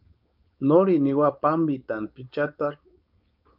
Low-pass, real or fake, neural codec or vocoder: 5.4 kHz; fake; codec, 16 kHz, 4.8 kbps, FACodec